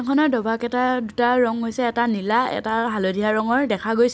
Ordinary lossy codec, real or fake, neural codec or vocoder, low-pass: none; real; none; none